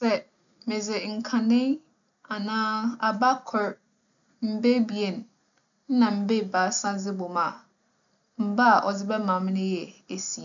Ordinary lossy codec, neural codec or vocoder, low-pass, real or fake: none; none; 7.2 kHz; real